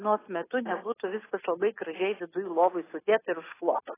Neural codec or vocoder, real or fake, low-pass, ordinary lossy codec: none; real; 3.6 kHz; AAC, 16 kbps